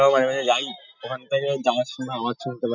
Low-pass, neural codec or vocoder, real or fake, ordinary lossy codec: 7.2 kHz; none; real; none